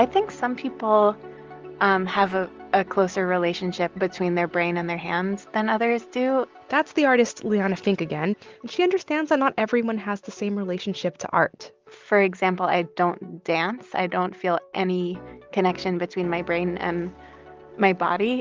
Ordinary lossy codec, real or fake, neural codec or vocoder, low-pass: Opus, 24 kbps; real; none; 7.2 kHz